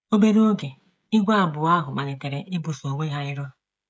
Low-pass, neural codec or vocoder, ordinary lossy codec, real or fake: none; codec, 16 kHz, 16 kbps, FreqCodec, smaller model; none; fake